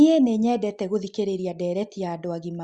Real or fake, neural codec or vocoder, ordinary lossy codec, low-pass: real; none; Opus, 64 kbps; 9.9 kHz